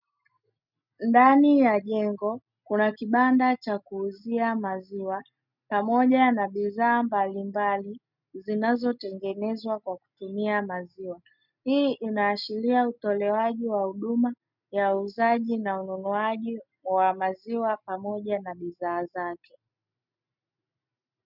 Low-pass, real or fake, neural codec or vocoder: 5.4 kHz; real; none